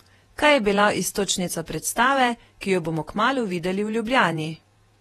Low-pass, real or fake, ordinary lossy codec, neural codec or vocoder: 19.8 kHz; fake; AAC, 32 kbps; vocoder, 48 kHz, 128 mel bands, Vocos